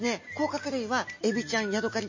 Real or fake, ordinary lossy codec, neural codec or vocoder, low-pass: real; MP3, 32 kbps; none; 7.2 kHz